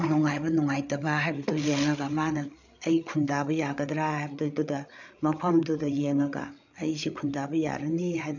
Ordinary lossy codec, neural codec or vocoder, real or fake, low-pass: none; codec, 16 kHz, 8 kbps, FreqCodec, larger model; fake; 7.2 kHz